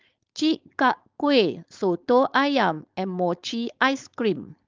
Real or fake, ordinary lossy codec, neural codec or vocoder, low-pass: fake; Opus, 24 kbps; codec, 16 kHz, 4.8 kbps, FACodec; 7.2 kHz